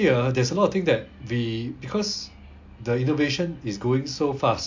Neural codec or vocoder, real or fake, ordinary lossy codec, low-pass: none; real; MP3, 48 kbps; 7.2 kHz